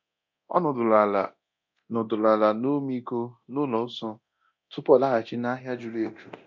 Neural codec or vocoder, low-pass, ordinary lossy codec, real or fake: codec, 24 kHz, 0.9 kbps, DualCodec; 7.2 kHz; MP3, 48 kbps; fake